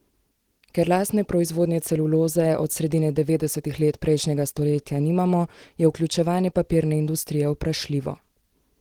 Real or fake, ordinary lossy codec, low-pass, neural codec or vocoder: real; Opus, 16 kbps; 19.8 kHz; none